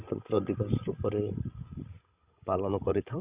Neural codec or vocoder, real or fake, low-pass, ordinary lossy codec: codec, 16 kHz, 16 kbps, FreqCodec, larger model; fake; 3.6 kHz; Opus, 64 kbps